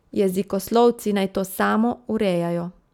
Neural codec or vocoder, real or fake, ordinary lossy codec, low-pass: none; real; none; 19.8 kHz